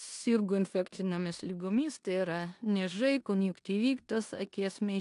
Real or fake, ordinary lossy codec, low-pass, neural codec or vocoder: fake; AAC, 64 kbps; 10.8 kHz; codec, 16 kHz in and 24 kHz out, 0.9 kbps, LongCat-Audio-Codec, four codebook decoder